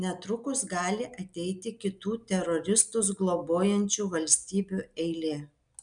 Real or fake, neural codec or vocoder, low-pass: real; none; 9.9 kHz